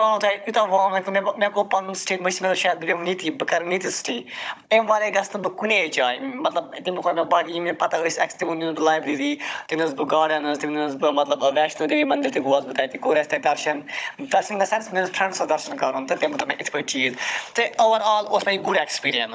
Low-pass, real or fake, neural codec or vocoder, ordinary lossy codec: none; fake; codec, 16 kHz, 16 kbps, FunCodec, trained on Chinese and English, 50 frames a second; none